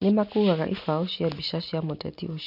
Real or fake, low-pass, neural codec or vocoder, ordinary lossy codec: real; 5.4 kHz; none; none